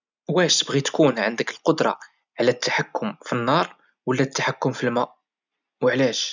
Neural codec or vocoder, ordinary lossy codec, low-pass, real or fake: none; none; 7.2 kHz; real